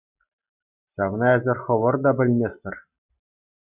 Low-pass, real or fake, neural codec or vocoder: 3.6 kHz; real; none